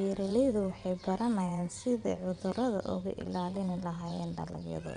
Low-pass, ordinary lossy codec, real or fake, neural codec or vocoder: 9.9 kHz; none; fake; vocoder, 22.05 kHz, 80 mel bands, WaveNeXt